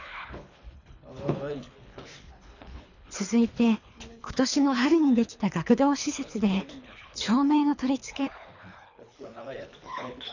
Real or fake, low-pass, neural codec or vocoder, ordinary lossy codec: fake; 7.2 kHz; codec, 24 kHz, 3 kbps, HILCodec; none